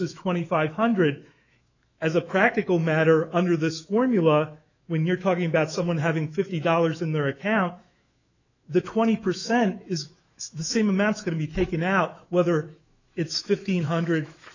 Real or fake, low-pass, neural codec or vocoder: fake; 7.2 kHz; autoencoder, 48 kHz, 128 numbers a frame, DAC-VAE, trained on Japanese speech